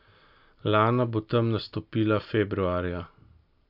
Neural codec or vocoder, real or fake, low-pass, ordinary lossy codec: none; real; 5.4 kHz; AAC, 48 kbps